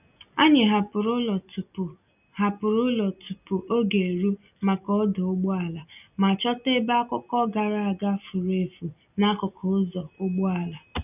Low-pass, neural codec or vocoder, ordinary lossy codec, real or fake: 3.6 kHz; none; none; real